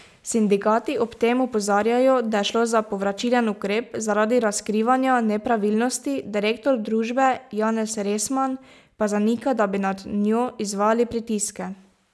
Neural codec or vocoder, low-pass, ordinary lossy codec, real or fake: none; none; none; real